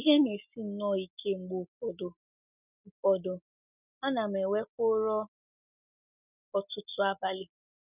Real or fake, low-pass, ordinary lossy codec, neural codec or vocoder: real; 3.6 kHz; none; none